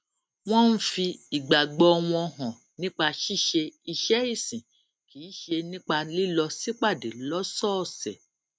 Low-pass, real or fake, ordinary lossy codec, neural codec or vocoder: none; real; none; none